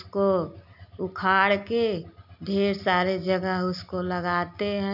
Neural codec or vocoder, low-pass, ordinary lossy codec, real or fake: none; 5.4 kHz; none; real